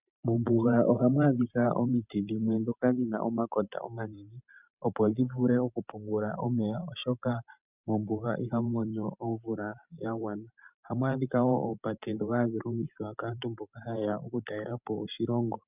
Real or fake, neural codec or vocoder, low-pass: fake; vocoder, 44.1 kHz, 128 mel bands every 512 samples, BigVGAN v2; 3.6 kHz